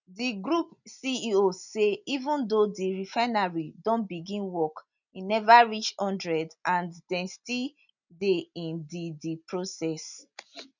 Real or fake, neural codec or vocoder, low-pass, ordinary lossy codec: real; none; 7.2 kHz; none